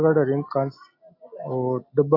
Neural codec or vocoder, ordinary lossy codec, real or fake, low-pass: none; AAC, 32 kbps; real; 5.4 kHz